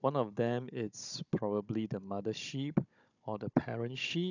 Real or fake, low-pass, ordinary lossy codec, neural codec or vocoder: fake; 7.2 kHz; none; codec, 16 kHz, 16 kbps, FunCodec, trained on Chinese and English, 50 frames a second